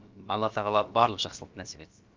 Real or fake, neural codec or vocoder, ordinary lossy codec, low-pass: fake; codec, 16 kHz, about 1 kbps, DyCAST, with the encoder's durations; Opus, 24 kbps; 7.2 kHz